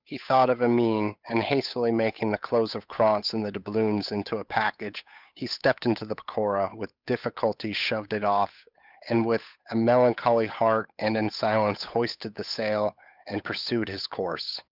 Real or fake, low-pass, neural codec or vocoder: fake; 5.4 kHz; codec, 16 kHz, 8 kbps, FunCodec, trained on Chinese and English, 25 frames a second